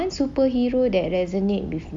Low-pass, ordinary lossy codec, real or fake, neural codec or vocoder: none; none; real; none